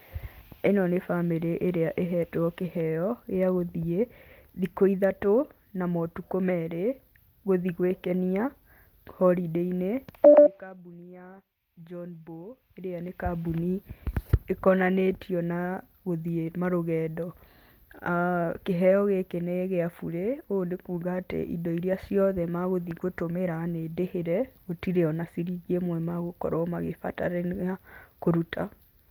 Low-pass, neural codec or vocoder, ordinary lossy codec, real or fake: 19.8 kHz; none; Opus, 32 kbps; real